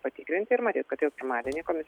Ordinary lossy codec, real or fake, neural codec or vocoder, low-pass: MP3, 96 kbps; real; none; 19.8 kHz